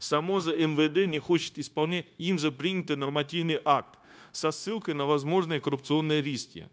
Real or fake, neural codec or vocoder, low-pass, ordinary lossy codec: fake; codec, 16 kHz, 0.9 kbps, LongCat-Audio-Codec; none; none